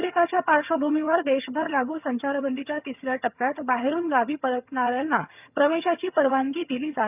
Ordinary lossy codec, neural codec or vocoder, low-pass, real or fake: none; vocoder, 22.05 kHz, 80 mel bands, HiFi-GAN; 3.6 kHz; fake